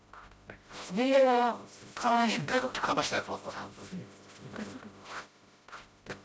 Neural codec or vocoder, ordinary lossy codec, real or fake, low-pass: codec, 16 kHz, 0.5 kbps, FreqCodec, smaller model; none; fake; none